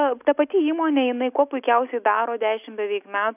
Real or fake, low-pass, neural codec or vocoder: real; 3.6 kHz; none